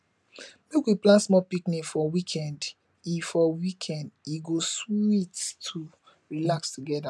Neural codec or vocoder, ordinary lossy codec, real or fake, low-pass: none; none; real; none